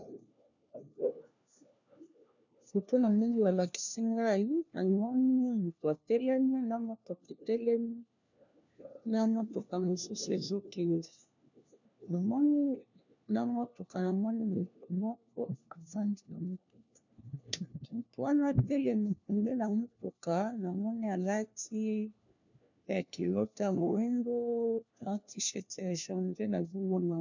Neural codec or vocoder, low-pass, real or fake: codec, 16 kHz, 1 kbps, FunCodec, trained on LibriTTS, 50 frames a second; 7.2 kHz; fake